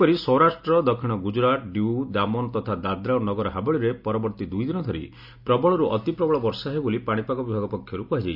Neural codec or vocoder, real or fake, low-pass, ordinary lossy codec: none; real; 5.4 kHz; none